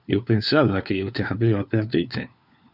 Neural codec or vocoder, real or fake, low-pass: codec, 16 kHz, 4 kbps, FunCodec, trained on LibriTTS, 50 frames a second; fake; 5.4 kHz